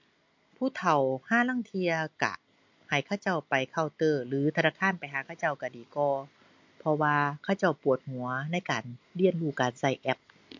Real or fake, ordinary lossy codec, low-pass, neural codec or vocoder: real; MP3, 48 kbps; 7.2 kHz; none